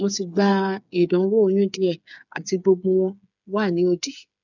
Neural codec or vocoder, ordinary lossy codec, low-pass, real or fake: codec, 16 kHz, 8 kbps, FreqCodec, smaller model; none; 7.2 kHz; fake